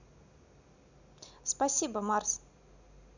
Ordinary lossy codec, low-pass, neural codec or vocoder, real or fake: none; 7.2 kHz; none; real